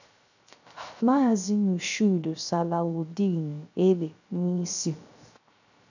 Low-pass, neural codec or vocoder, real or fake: 7.2 kHz; codec, 16 kHz, 0.3 kbps, FocalCodec; fake